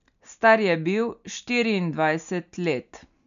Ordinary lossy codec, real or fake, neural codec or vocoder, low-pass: AAC, 96 kbps; real; none; 7.2 kHz